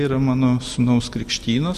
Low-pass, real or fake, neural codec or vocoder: 14.4 kHz; fake; vocoder, 44.1 kHz, 128 mel bands every 256 samples, BigVGAN v2